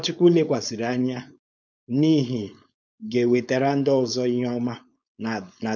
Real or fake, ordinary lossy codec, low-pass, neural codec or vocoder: fake; none; none; codec, 16 kHz, 4.8 kbps, FACodec